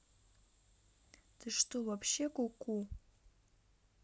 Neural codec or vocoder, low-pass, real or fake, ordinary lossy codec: none; none; real; none